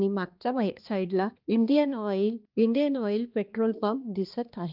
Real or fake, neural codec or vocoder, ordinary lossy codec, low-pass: fake; codec, 16 kHz, 2 kbps, X-Codec, HuBERT features, trained on balanced general audio; Opus, 24 kbps; 5.4 kHz